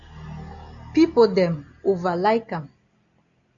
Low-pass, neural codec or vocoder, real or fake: 7.2 kHz; none; real